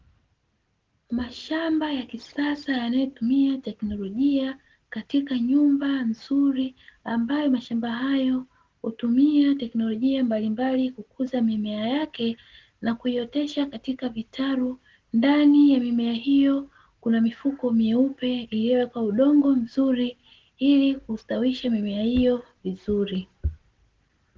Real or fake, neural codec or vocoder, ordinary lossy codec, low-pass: real; none; Opus, 16 kbps; 7.2 kHz